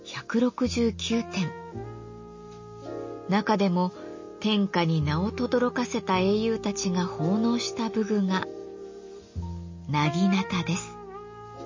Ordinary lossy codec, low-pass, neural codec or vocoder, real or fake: MP3, 32 kbps; 7.2 kHz; none; real